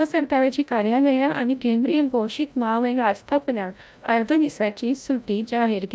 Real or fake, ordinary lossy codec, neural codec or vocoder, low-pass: fake; none; codec, 16 kHz, 0.5 kbps, FreqCodec, larger model; none